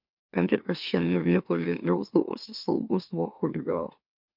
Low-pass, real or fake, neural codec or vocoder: 5.4 kHz; fake; autoencoder, 44.1 kHz, a latent of 192 numbers a frame, MeloTTS